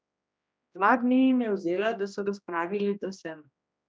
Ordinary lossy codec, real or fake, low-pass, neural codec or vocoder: none; fake; none; codec, 16 kHz, 1 kbps, X-Codec, HuBERT features, trained on general audio